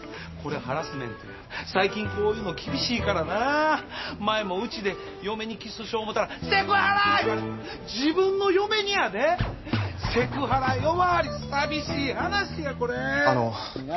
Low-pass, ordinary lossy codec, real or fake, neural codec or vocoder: 7.2 kHz; MP3, 24 kbps; real; none